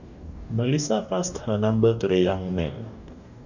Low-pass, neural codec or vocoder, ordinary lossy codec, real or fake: 7.2 kHz; codec, 44.1 kHz, 2.6 kbps, DAC; none; fake